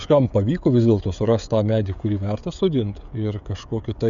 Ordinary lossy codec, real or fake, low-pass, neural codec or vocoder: MP3, 96 kbps; fake; 7.2 kHz; codec, 16 kHz, 16 kbps, FreqCodec, smaller model